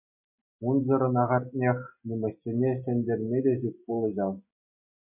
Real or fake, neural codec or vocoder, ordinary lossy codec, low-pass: real; none; MP3, 32 kbps; 3.6 kHz